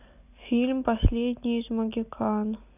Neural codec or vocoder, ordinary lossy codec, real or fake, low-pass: none; none; real; 3.6 kHz